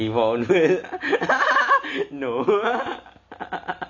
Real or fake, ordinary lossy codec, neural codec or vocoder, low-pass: real; none; none; 7.2 kHz